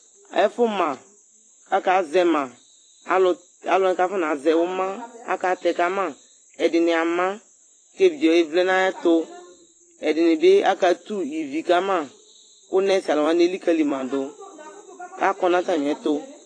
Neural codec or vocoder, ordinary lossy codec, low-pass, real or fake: vocoder, 44.1 kHz, 128 mel bands every 256 samples, BigVGAN v2; AAC, 32 kbps; 9.9 kHz; fake